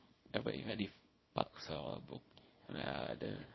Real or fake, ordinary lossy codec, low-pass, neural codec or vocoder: fake; MP3, 24 kbps; 7.2 kHz; codec, 24 kHz, 0.9 kbps, WavTokenizer, small release